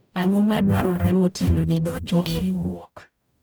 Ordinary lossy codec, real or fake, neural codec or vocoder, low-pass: none; fake; codec, 44.1 kHz, 0.9 kbps, DAC; none